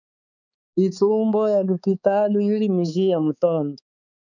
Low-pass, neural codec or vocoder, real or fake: 7.2 kHz; codec, 16 kHz, 4 kbps, X-Codec, HuBERT features, trained on balanced general audio; fake